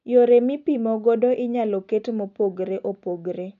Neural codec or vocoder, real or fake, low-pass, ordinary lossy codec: none; real; 7.2 kHz; none